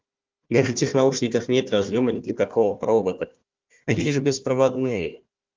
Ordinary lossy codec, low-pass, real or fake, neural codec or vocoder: Opus, 24 kbps; 7.2 kHz; fake; codec, 16 kHz, 1 kbps, FunCodec, trained on Chinese and English, 50 frames a second